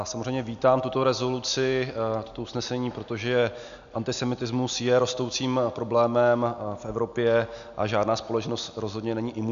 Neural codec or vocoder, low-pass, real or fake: none; 7.2 kHz; real